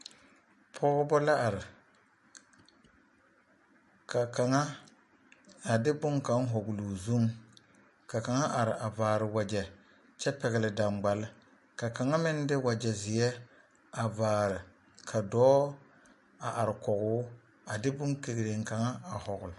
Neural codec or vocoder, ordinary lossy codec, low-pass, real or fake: none; MP3, 48 kbps; 14.4 kHz; real